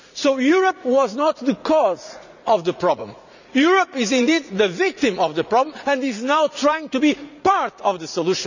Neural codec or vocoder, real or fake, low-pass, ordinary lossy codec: vocoder, 44.1 kHz, 80 mel bands, Vocos; fake; 7.2 kHz; none